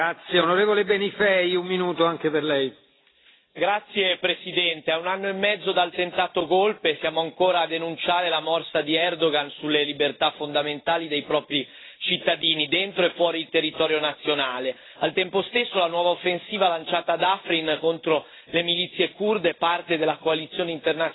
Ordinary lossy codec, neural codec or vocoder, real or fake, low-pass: AAC, 16 kbps; none; real; 7.2 kHz